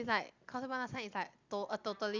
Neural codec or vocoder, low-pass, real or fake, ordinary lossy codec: none; 7.2 kHz; real; Opus, 64 kbps